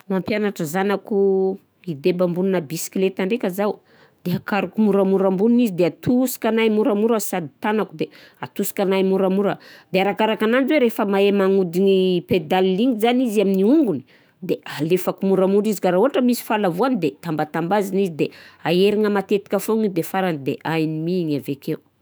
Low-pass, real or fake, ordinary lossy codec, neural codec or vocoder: none; fake; none; autoencoder, 48 kHz, 128 numbers a frame, DAC-VAE, trained on Japanese speech